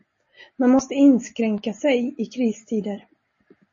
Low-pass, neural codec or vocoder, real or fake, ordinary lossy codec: 7.2 kHz; none; real; MP3, 32 kbps